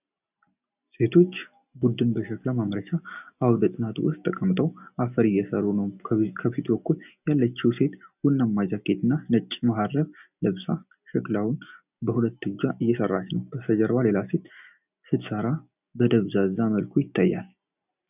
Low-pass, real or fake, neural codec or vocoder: 3.6 kHz; real; none